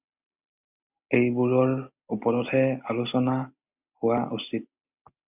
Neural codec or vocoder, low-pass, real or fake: none; 3.6 kHz; real